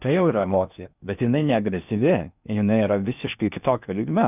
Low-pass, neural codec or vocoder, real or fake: 3.6 kHz; codec, 16 kHz in and 24 kHz out, 0.8 kbps, FocalCodec, streaming, 65536 codes; fake